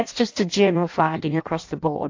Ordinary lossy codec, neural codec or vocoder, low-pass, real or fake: AAC, 48 kbps; codec, 16 kHz in and 24 kHz out, 0.6 kbps, FireRedTTS-2 codec; 7.2 kHz; fake